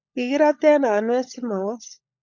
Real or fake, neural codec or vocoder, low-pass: fake; codec, 16 kHz, 16 kbps, FunCodec, trained on LibriTTS, 50 frames a second; 7.2 kHz